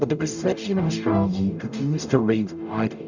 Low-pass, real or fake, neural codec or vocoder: 7.2 kHz; fake; codec, 44.1 kHz, 0.9 kbps, DAC